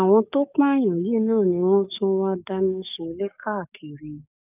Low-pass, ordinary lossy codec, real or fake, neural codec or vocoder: 3.6 kHz; none; fake; codec, 44.1 kHz, 7.8 kbps, DAC